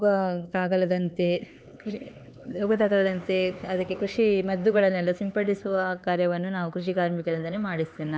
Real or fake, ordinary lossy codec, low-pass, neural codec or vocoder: fake; none; none; codec, 16 kHz, 4 kbps, X-Codec, HuBERT features, trained on LibriSpeech